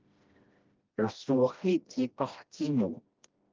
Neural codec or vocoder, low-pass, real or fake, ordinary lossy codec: codec, 16 kHz, 1 kbps, FreqCodec, smaller model; 7.2 kHz; fake; Opus, 24 kbps